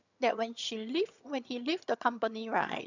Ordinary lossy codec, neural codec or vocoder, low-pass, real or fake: none; vocoder, 22.05 kHz, 80 mel bands, HiFi-GAN; 7.2 kHz; fake